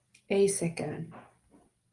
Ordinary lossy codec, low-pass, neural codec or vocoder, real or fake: Opus, 32 kbps; 10.8 kHz; none; real